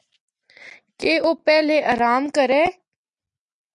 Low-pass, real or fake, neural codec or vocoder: 10.8 kHz; real; none